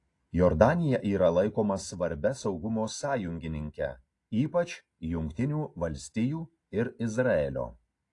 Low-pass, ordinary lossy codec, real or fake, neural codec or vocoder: 10.8 kHz; AAC, 48 kbps; real; none